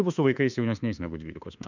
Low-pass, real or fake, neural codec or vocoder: 7.2 kHz; fake; autoencoder, 48 kHz, 32 numbers a frame, DAC-VAE, trained on Japanese speech